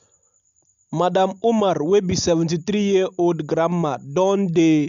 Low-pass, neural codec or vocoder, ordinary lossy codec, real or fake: 7.2 kHz; none; MP3, 64 kbps; real